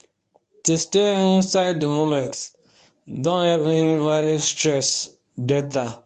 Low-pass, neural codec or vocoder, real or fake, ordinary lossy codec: 10.8 kHz; codec, 24 kHz, 0.9 kbps, WavTokenizer, medium speech release version 1; fake; MP3, 64 kbps